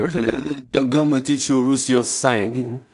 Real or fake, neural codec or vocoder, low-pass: fake; codec, 16 kHz in and 24 kHz out, 0.4 kbps, LongCat-Audio-Codec, two codebook decoder; 10.8 kHz